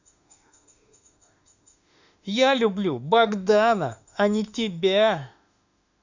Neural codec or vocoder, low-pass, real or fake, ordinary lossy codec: autoencoder, 48 kHz, 32 numbers a frame, DAC-VAE, trained on Japanese speech; 7.2 kHz; fake; none